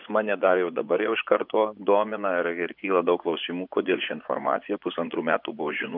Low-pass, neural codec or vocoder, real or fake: 5.4 kHz; vocoder, 24 kHz, 100 mel bands, Vocos; fake